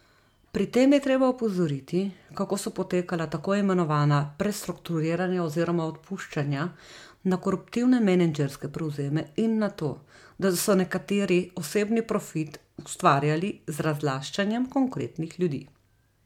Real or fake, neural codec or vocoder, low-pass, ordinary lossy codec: real; none; 19.8 kHz; MP3, 96 kbps